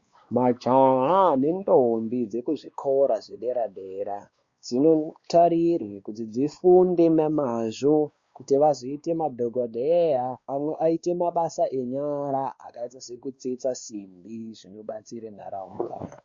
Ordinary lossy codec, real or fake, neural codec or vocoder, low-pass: Opus, 64 kbps; fake; codec, 16 kHz, 2 kbps, X-Codec, WavLM features, trained on Multilingual LibriSpeech; 7.2 kHz